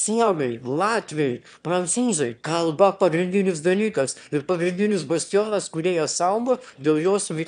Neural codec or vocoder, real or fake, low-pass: autoencoder, 22.05 kHz, a latent of 192 numbers a frame, VITS, trained on one speaker; fake; 9.9 kHz